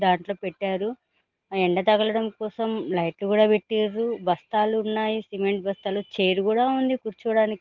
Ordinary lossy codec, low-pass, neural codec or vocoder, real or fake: Opus, 16 kbps; 7.2 kHz; none; real